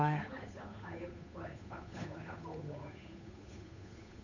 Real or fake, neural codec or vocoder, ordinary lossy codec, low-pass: fake; codec, 16 kHz, 8 kbps, FunCodec, trained on Chinese and English, 25 frames a second; none; 7.2 kHz